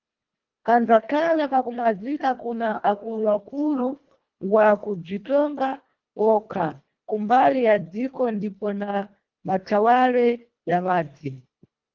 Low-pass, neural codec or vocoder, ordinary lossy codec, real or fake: 7.2 kHz; codec, 24 kHz, 1.5 kbps, HILCodec; Opus, 32 kbps; fake